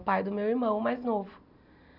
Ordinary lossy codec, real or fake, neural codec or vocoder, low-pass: none; real; none; 5.4 kHz